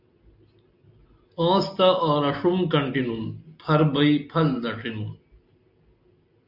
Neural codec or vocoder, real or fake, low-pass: none; real; 5.4 kHz